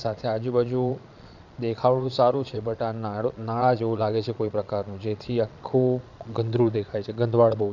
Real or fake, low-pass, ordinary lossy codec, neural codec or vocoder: fake; 7.2 kHz; none; vocoder, 22.05 kHz, 80 mel bands, Vocos